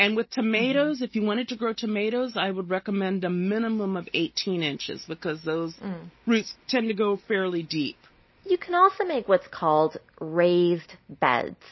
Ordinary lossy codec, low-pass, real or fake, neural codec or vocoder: MP3, 24 kbps; 7.2 kHz; real; none